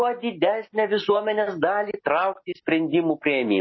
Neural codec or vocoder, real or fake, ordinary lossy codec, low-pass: none; real; MP3, 24 kbps; 7.2 kHz